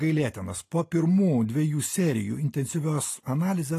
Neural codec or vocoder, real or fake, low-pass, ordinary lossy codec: none; real; 14.4 kHz; AAC, 48 kbps